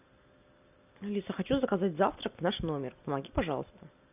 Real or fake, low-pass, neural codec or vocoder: real; 3.6 kHz; none